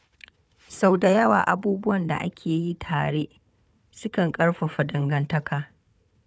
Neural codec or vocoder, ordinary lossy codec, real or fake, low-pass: codec, 16 kHz, 4 kbps, FunCodec, trained on Chinese and English, 50 frames a second; none; fake; none